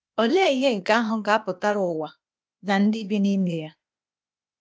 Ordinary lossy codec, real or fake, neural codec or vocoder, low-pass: none; fake; codec, 16 kHz, 0.8 kbps, ZipCodec; none